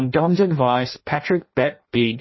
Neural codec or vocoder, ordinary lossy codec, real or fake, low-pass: codec, 16 kHz in and 24 kHz out, 0.6 kbps, FireRedTTS-2 codec; MP3, 24 kbps; fake; 7.2 kHz